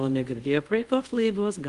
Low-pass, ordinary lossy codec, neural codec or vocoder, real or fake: 10.8 kHz; Opus, 32 kbps; codec, 24 kHz, 0.5 kbps, DualCodec; fake